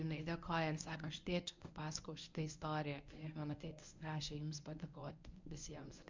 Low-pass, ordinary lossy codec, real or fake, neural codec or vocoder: 7.2 kHz; MP3, 64 kbps; fake; codec, 24 kHz, 0.9 kbps, WavTokenizer, medium speech release version 1